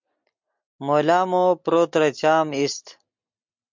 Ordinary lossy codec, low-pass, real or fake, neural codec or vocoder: MP3, 64 kbps; 7.2 kHz; real; none